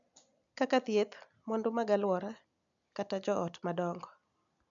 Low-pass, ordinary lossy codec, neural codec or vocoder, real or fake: 7.2 kHz; none; none; real